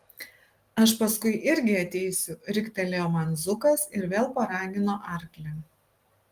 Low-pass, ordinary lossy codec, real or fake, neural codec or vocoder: 14.4 kHz; Opus, 24 kbps; real; none